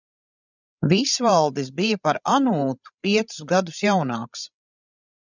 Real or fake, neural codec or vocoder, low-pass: fake; codec, 16 kHz, 16 kbps, FreqCodec, larger model; 7.2 kHz